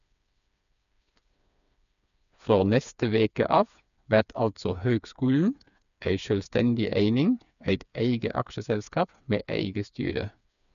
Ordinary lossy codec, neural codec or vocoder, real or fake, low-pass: none; codec, 16 kHz, 4 kbps, FreqCodec, smaller model; fake; 7.2 kHz